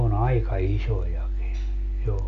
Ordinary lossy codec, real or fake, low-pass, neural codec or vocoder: none; real; 7.2 kHz; none